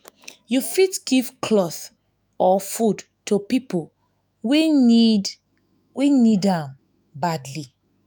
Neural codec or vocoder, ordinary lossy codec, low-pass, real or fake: autoencoder, 48 kHz, 128 numbers a frame, DAC-VAE, trained on Japanese speech; none; none; fake